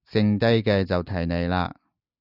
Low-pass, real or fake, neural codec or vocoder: 5.4 kHz; real; none